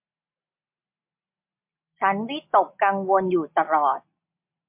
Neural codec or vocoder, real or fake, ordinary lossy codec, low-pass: none; real; MP3, 32 kbps; 3.6 kHz